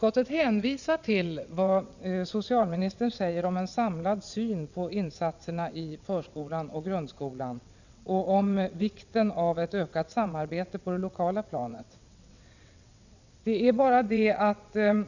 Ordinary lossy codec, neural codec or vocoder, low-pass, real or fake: none; vocoder, 22.05 kHz, 80 mel bands, WaveNeXt; 7.2 kHz; fake